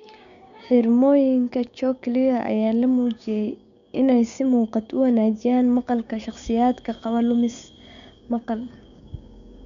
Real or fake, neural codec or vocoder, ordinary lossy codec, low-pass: fake; codec, 16 kHz, 6 kbps, DAC; none; 7.2 kHz